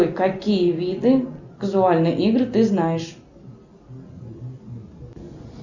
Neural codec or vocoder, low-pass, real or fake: none; 7.2 kHz; real